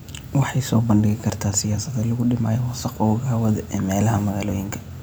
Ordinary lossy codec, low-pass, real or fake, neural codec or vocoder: none; none; fake; vocoder, 44.1 kHz, 128 mel bands every 256 samples, BigVGAN v2